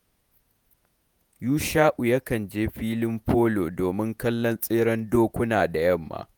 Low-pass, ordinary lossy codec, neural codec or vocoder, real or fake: none; none; vocoder, 48 kHz, 128 mel bands, Vocos; fake